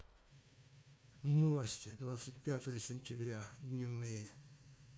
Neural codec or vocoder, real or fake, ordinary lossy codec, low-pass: codec, 16 kHz, 1 kbps, FunCodec, trained on Chinese and English, 50 frames a second; fake; none; none